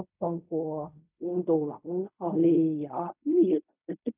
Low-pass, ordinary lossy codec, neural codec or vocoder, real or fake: 3.6 kHz; none; codec, 16 kHz in and 24 kHz out, 0.4 kbps, LongCat-Audio-Codec, fine tuned four codebook decoder; fake